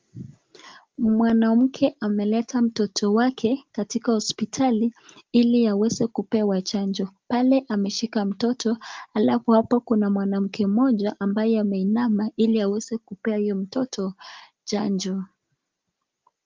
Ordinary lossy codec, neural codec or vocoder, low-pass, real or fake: Opus, 24 kbps; none; 7.2 kHz; real